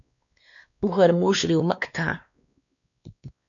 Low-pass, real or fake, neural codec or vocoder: 7.2 kHz; fake; codec, 16 kHz, 2 kbps, X-Codec, WavLM features, trained on Multilingual LibriSpeech